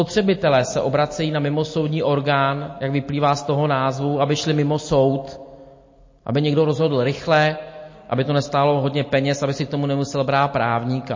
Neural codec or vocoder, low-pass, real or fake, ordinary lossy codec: none; 7.2 kHz; real; MP3, 32 kbps